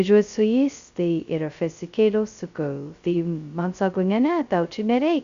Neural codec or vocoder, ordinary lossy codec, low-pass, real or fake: codec, 16 kHz, 0.2 kbps, FocalCodec; Opus, 64 kbps; 7.2 kHz; fake